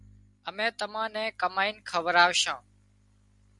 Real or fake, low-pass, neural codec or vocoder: real; 10.8 kHz; none